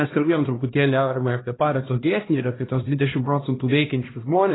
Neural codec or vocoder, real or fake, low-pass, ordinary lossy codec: codec, 16 kHz, 1 kbps, X-Codec, HuBERT features, trained on LibriSpeech; fake; 7.2 kHz; AAC, 16 kbps